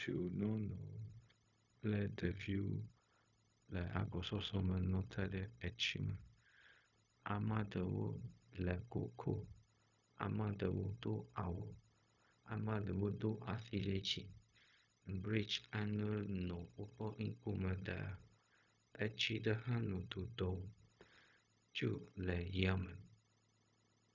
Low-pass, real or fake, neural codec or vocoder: 7.2 kHz; fake; codec, 16 kHz, 0.4 kbps, LongCat-Audio-Codec